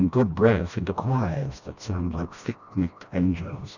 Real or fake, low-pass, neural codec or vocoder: fake; 7.2 kHz; codec, 16 kHz, 1 kbps, FreqCodec, smaller model